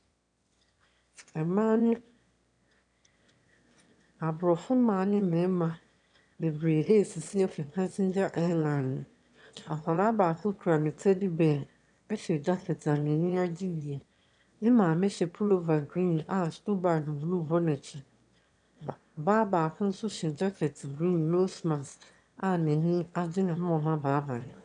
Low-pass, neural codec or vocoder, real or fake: 9.9 kHz; autoencoder, 22.05 kHz, a latent of 192 numbers a frame, VITS, trained on one speaker; fake